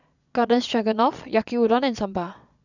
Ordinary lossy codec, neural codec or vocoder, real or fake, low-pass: none; vocoder, 22.05 kHz, 80 mel bands, Vocos; fake; 7.2 kHz